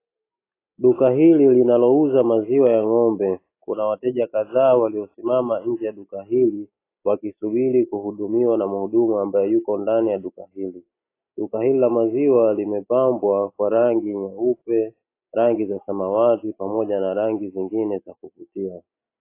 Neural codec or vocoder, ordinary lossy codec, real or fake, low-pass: none; AAC, 24 kbps; real; 3.6 kHz